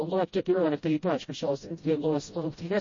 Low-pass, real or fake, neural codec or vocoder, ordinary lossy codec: 7.2 kHz; fake; codec, 16 kHz, 0.5 kbps, FreqCodec, smaller model; MP3, 32 kbps